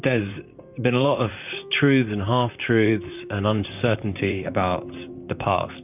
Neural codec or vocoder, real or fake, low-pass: vocoder, 44.1 kHz, 128 mel bands, Pupu-Vocoder; fake; 3.6 kHz